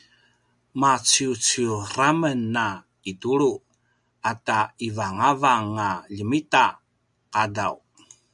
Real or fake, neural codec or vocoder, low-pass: real; none; 10.8 kHz